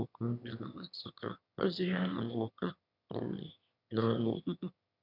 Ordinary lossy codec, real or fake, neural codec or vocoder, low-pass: none; fake; autoencoder, 22.05 kHz, a latent of 192 numbers a frame, VITS, trained on one speaker; 5.4 kHz